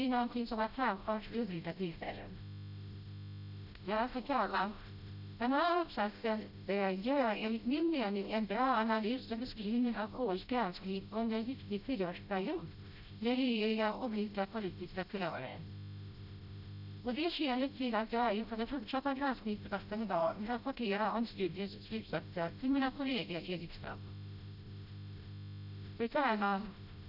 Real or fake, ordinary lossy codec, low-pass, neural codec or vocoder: fake; none; 5.4 kHz; codec, 16 kHz, 0.5 kbps, FreqCodec, smaller model